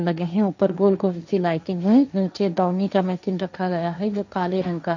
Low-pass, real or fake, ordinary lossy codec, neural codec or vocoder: 7.2 kHz; fake; none; codec, 16 kHz, 1.1 kbps, Voila-Tokenizer